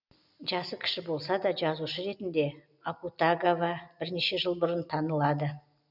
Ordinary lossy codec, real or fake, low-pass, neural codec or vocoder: none; real; 5.4 kHz; none